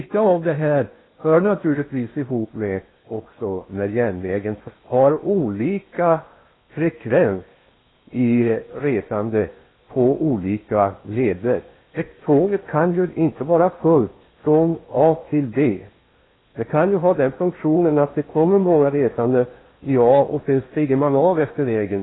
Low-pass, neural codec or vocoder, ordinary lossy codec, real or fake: 7.2 kHz; codec, 16 kHz in and 24 kHz out, 0.8 kbps, FocalCodec, streaming, 65536 codes; AAC, 16 kbps; fake